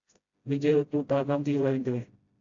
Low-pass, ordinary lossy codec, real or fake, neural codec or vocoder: 7.2 kHz; AAC, 48 kbps; fake; codec, 16 kHz, 0.5 kbps, FreqCodec, smaller model